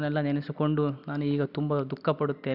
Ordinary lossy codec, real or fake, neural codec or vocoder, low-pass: none; real; none; 5.4 kHz